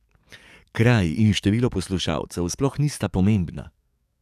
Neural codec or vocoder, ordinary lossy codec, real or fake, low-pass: codec, 44.1 kHz, 7.8 kbps, DAC; none; fake; 14.4 kHz